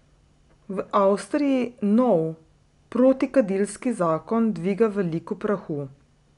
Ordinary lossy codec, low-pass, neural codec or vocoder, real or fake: none; 10.8 kHz; none; real